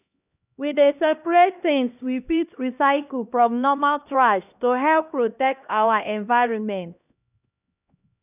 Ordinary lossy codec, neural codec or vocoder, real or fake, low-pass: none; codec, 16 kHz, 1 kbps, X-Codec, HuBERT features, trained on LibriSpeech; fake; 3.6 kHz